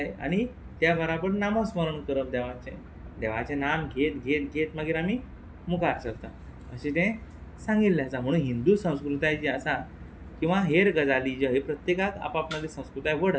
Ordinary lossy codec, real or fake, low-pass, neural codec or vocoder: none; real; none; none